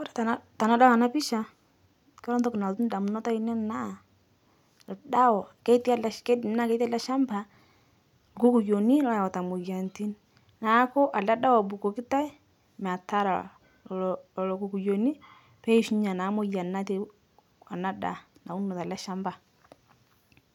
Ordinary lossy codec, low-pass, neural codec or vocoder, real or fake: none; 19.8 kHz; none; real